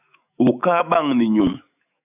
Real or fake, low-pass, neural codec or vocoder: fake; 3.6 kHz; autoencoder, 48 kHz, 128 numbers a frame, DAC-VAE, trained on Japanese speech